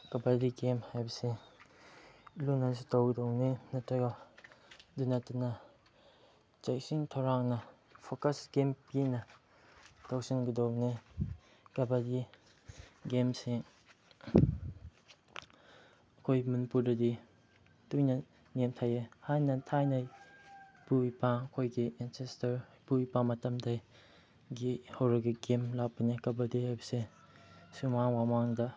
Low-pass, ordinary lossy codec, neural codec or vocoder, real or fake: none; none; none; real